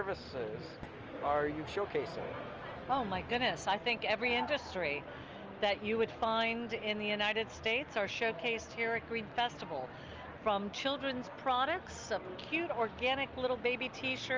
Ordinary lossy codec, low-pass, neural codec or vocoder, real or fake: Opus, 24 kbps; 7.2 kHz; none; real